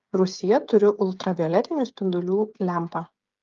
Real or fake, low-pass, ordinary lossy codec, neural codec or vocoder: fake; 7.2 kHz; Opus, 32 kbps; codec, 16 kHz, 8 kbps, FreqCodec, smaller model